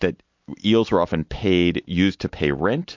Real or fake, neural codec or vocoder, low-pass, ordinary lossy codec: real; none; 7.2 kHz; MP3, 48 kbps